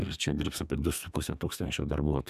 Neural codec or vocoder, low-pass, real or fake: codec, 32 kHz, 1.9 kbps, SNAC; 14.4 kHz; fake